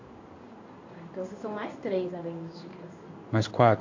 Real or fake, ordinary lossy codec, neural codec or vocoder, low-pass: fake; AAC, 48 kbps; codec, 16 kHz in and 24 kHz out, 1 kbps, XY-Tokenizer; 7.2 kHz